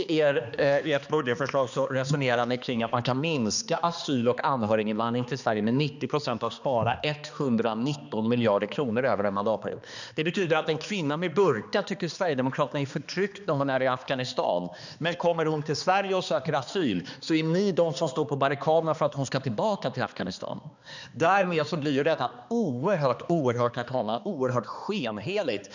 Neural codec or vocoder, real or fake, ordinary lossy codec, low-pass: codec, 16 kHz, 2 kbps, X-Codec, HuBERT features, trained on balanced general audio; fake; none; 7.2 kHz